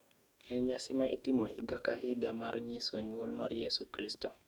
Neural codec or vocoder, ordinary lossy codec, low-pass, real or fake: codec, 44.1 kHz, 2.6 kbps, DAC; none; 19.8 kHz; fake